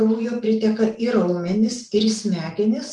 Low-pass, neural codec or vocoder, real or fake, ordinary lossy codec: 10.8 kHz; none; real; Opus, 32 kbps